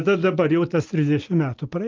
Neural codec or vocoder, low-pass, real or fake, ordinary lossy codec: none; 7.2 kHz; real; Opus, 24 kbps